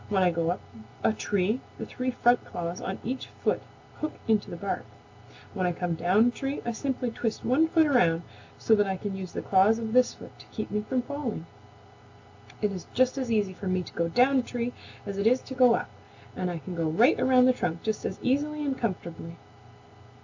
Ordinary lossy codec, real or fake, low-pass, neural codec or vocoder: AAC, 48 kbps; real; 7.2 kHz; none